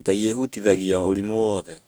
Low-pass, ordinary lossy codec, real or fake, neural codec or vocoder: none; none; fake; codec, 44.1 kHz, 2.6 kbps, DAC